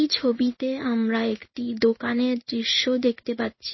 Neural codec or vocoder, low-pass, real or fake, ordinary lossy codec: codec, 16 kHz in and 24 kHz out, 1 kbps, XY-Tokenizer; 7.2 kHz; fake; MP3, 24 kbps